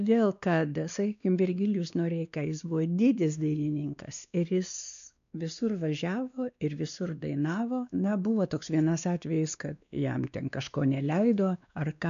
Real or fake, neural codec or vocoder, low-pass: fake; codec, 16 kHz, 2 kbps, X-Codec, WavLM features, trained on Multilingual LibriSpeech; 7.2 kHz